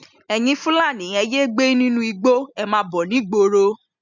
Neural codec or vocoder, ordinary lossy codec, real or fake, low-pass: none; none; real; 7.2 kHz